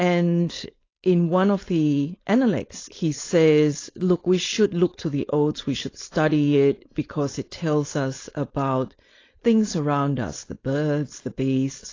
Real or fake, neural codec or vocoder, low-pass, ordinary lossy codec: fake; codec, 16 kHz, 4.8 kbps, FACodec; 7.2 kHz; AAC, 32 kbps